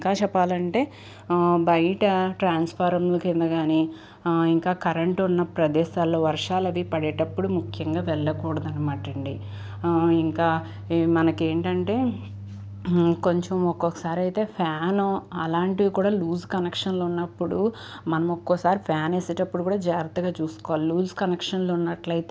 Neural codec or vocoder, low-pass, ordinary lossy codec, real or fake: none; none; none; real